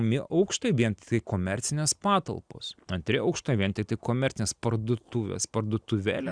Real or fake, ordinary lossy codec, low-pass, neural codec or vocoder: real; Opus, 64 kbps; 9.9 kHz; none